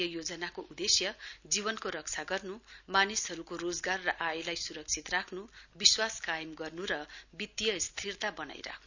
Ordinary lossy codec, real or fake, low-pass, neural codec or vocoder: none; real; 7.2 kHz; none